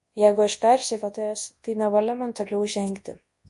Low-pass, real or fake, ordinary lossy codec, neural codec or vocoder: 10.8 kHz; fake; MP3, 48 kbps; codec, 24 kHz, 0.9 kbps, WavTokenizer, large speech release